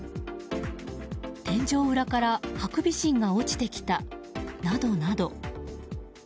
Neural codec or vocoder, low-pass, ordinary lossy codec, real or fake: none; none; none; real